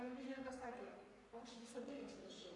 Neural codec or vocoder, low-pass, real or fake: codec, 44.1 kHz, 3.4 kbps, Pupu-Codec; 10.8 kHz; fake